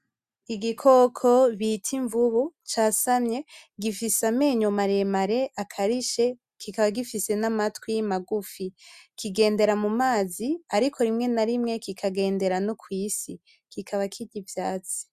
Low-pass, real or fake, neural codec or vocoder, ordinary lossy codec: 14.4 kHz; real; none; Opus, 64 kbps